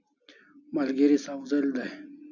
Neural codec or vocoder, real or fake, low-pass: none; real; 7.2 kHz